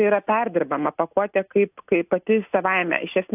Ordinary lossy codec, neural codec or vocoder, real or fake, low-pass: AAC, 32 kbps; none; real; 3.6 kHz